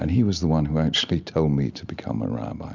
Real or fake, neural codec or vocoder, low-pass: real; none; 7.2 kHz